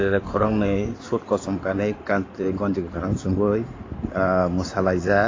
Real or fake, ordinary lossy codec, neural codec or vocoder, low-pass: fake; AAC, 32 kbps; codec, 16 kHz in and 24 kHz out, 2.2 kbps, FireRedTTS-2 codec; 7.2 kHz